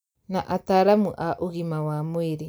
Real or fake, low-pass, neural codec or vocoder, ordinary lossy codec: real; none; none; none